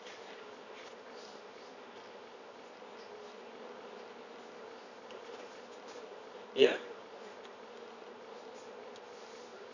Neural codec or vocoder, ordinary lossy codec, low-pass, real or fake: codec, 24 kHz, 0.9 kbps, WavTokenizer, medium music audio release; none; 7.2 kHz; fake